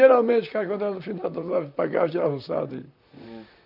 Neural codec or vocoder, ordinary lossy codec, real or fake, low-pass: vocoder, 44.1 kHz, 128 mel bands, Pupu-Vocoder; none; fake; 5.4 kHz